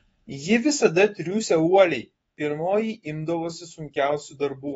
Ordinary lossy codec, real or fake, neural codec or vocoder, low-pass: AAC, 24 kbps; fake; codec, 24 kHz, 3.1 kbps, DualCodec; 10.8 kHz